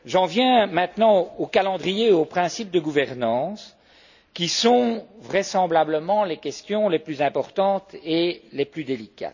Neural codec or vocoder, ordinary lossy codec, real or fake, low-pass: none; none; real; 7.2 kHz